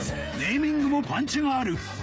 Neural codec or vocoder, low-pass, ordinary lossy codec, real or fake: codec, 16 kHz, 16 kbps, FreqCodec, smaller model; none; none; fake